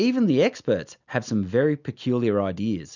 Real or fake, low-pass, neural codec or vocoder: real; 7.2 kHz; none